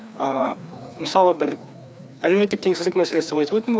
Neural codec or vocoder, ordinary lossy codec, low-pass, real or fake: codec, 16 kHz, 2 kbps, FreqCodec, larger model; none; none; fake